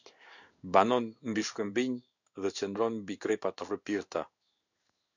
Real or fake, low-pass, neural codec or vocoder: fake; 7.2 kHz; codec, 16 kHz in and 24 kHz out, 1 kbps, XY-Tokenizer